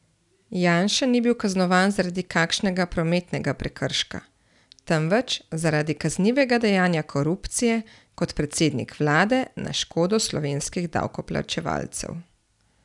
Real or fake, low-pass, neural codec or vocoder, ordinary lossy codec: real; 10.8 kHz; none; none